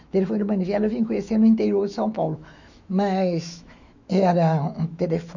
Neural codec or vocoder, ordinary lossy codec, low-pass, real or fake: autoencoder, 48 kHz, 128 numbers a frame, DAC-VAE, trained on Japanese speech; none; 7.2 kHz; fake